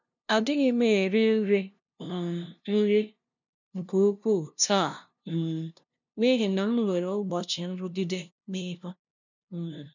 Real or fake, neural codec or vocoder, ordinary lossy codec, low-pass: fake; codec, 16 kHz, 0.5 kbps, FunCodec, trained on LibriTTS, 25 frames a second; none; 7.2 kHz